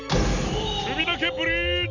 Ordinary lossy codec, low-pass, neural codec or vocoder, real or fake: none; 7.2 kHz; none; real